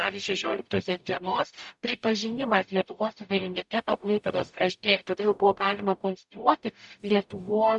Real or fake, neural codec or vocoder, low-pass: fake; codec, 44.1 kHz, 0.9 kbps, DAC; 10.8 kHz